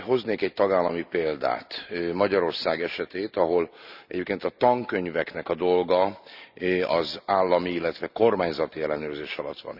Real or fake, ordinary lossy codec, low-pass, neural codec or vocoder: real; none; 5.4 kHz; none